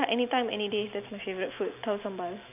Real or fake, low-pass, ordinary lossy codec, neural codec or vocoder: real; 3.6 kHz; none; none